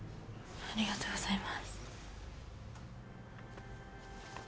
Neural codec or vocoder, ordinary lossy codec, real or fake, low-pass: none; none; real; none